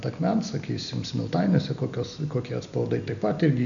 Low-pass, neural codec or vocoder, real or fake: 7.2 kHz; none; real